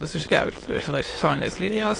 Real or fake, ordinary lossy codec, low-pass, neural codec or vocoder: fake; AAC, 32 kbps; 9.9 kHz; autoencoder, 22.05 kHz, a latent of 192 numbers a frame, VITS, trained on many speakers